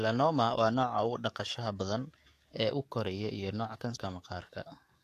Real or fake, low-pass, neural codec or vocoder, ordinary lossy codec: fake; 14.4 kHz; codec, 44.1 kHz, 7.8 kbps, DAC; AAC, 64 kbps